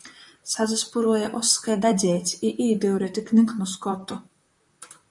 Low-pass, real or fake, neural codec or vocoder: 10.8 kHz; fake; vocoder, 44.1 kHz, 128 mel bands, Pupu-Vocoder